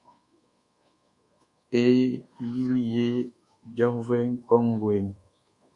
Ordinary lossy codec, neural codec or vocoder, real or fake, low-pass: Opus, 64 kbps; codec, 24 kHz, 1.2 kbps, DualCodec; fake; 10.8 kHz